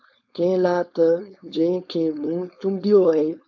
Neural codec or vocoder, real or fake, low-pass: codec, 16 kHz, 4.8 kbps, FACodec; fake; 7.2 kHz